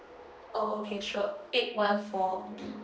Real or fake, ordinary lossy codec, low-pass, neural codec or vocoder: fake; none; none; codec, 16 kHz, 2 kbps, X-Codec, HuBERT features, trained on balanced general audio